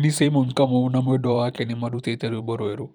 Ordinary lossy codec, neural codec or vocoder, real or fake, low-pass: none; vocoder, 44.1 kHz, 128 mel bands every 512 samples, BigVGAN v2; fake; 19.8 kHz